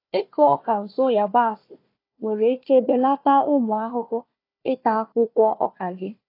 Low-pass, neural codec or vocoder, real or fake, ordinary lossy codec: 5.4 kHz; codec, 16 kHz, 1 kbps, FunCodec, trained on Chinese and English, 50 frames a second; fake; AAC, 32 kbps